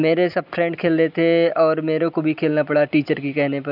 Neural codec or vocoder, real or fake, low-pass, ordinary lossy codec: none; real; 5.4 kHz; none